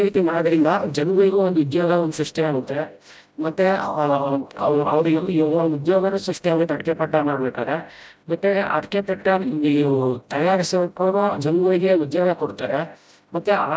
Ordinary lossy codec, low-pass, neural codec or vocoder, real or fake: none; none; codec, 16 kHz, 0.5 kbps, FreqCodec, smaller model; fake